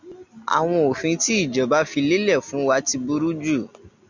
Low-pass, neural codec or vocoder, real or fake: 7.2 kHz; none; real